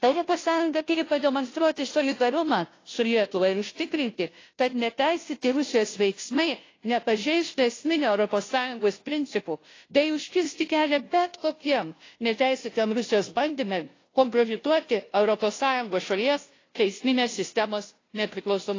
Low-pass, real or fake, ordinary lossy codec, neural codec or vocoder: 7.2 kHz; fake; AAC, 32 kbps; codec, 16 kHz, 0.5 kbps, FunCodec, trained on Chinese and English, 25 frames a second